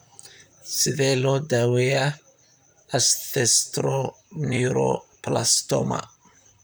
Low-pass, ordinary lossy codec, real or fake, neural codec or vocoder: none; none; fake; vocoder, 44.1 kHz, 128 mel bands, Pupu-Vocoder